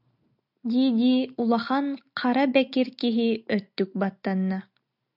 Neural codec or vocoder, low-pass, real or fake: none; 5.4 kHz; real